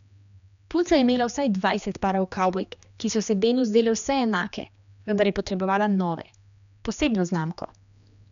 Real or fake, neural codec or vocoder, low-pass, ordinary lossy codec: fake; codec, 16 kHz, 2 kbps, X-Codec, HuBERT features, trained on general audio; 7.2 kHz; none